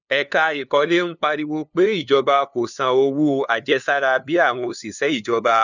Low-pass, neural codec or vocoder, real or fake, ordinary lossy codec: 7.2 kHz; codec, 16 kHz, 2 kbps, FunCodec, trained on LibriTTS, 25 frames a second; fake; none